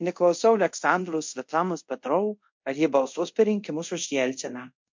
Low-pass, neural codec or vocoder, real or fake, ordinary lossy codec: 7.2 kHz; codec, 24 kHz, 0.5 kbps, DualCodec; fake; MP3, 48 kbps